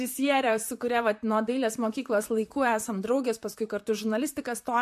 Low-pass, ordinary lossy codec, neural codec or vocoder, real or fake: 14.4 kHz; MP3, 64 kbps; vocoder, 44.1 kHz, 128 mel bands, Pupu-Vocoder; fake